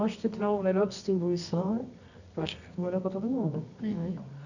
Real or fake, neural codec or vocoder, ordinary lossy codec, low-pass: fake; codec, 24 kHz, 0.9 kbps, WavTokenizer, medium music audio release; none; 7.2 kHz